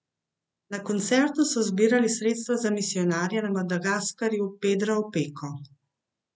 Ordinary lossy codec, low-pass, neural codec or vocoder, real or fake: none; none; none; real